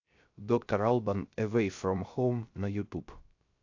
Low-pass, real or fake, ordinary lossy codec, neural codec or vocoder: 7.2 kHz; fake; AAC, 48 kbps; codec, 16 kHz, 0.3 kbps, FocalCodec